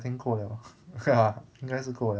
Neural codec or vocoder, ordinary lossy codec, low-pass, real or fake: none; none; none; real